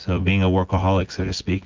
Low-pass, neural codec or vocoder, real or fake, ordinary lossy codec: 7.2 kHz; vocoder, 24 kHz, 100 mel bands, Vocos; fake; Opus, 32 kbps